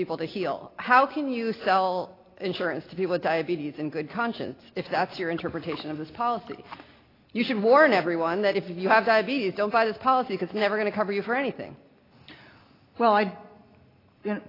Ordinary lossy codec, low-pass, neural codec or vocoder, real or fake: AAC, 24 kbps; 5.4 kHz; none; real